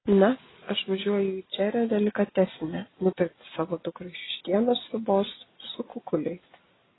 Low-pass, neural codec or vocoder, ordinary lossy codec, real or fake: 7.2 kHz; none; AAC, 16 kbps; real